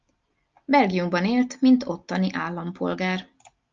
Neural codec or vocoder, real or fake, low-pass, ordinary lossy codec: none; real; 7.2 kHz; Opus, 24 kbps